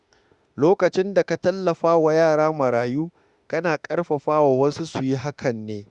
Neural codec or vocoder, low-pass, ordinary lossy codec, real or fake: autoencoder, 48 kHz, 32 numbers a frame, DAC-VAE, trained on Japanese speech; 10.8 kHz; Opus, 64 kbps; fake